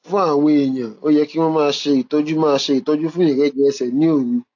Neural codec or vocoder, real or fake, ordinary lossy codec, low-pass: none; real; AAC, 48 kbps; 7.2 kHz